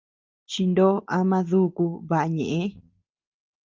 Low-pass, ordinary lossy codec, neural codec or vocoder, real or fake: 7.2 kHz; Opus, 24 kbps; none; real